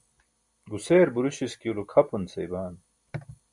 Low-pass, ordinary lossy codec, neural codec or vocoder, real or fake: 10.8 kHz; MP3, 96 kbps; none; real